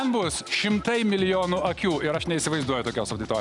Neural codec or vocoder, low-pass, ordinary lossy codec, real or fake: none; 10.8 kHz; Opus, 64 kbps; real